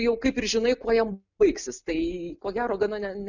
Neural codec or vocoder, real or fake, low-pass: none; real; 7.2 kHz